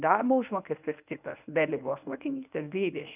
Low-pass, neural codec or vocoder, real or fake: 3.6 kHz; codec, 24 kHz, 0.9 kbps, WavTokenizer, medium speech release version 1; fake